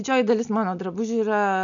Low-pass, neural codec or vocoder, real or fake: 7.2 kHz; none; real